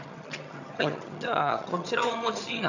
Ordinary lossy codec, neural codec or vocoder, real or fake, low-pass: none; vocoder, 22.05 kHz, 80 mel bands, HiFi-GAN; fake; 7.2 kHz